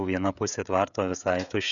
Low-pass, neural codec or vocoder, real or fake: 7.2 kHz; codec, 16 kHz, 16 kbps, FreqCodec, smaller model; fake